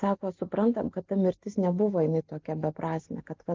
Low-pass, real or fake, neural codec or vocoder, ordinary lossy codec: 7.2 kHz; real; none; Opus, 24 kbps